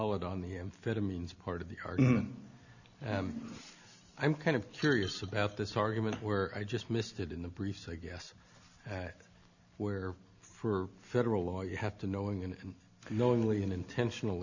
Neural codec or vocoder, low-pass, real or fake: none; 7.2 kHz; real